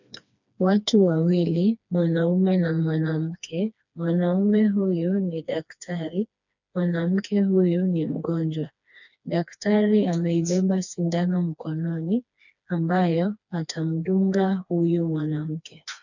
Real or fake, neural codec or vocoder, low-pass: fake; codec, 16 kHz, 2 kbps, FreqCodec, smaller model; 7.2 kHz